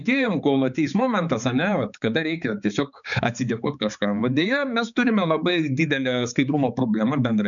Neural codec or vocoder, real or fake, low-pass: codec, 16 kHz, 4 kbps, X-Codec, HuBERT features, trained on balanced general audio; fake; 7.2 kHz